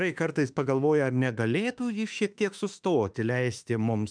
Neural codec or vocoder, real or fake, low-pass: autoencoder, 48 kHz, 32 numbers a frame, DAC-VAE, trained on Japanese speech; fake; 9.9 kHz